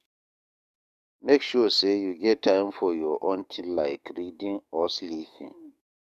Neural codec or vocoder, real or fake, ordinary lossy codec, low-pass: codec, 44.1 kHz, 7.8 kbps, DAC; fake; none; 14.4 kHz